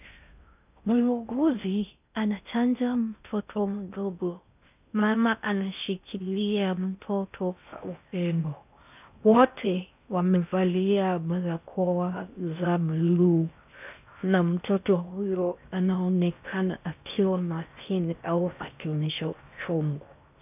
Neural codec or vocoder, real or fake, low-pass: codec, 16 kHz in and 24 kHz out, 0.6 kbps, FocalCodec, streaming, 4096 codes; fake; 3.6 kHz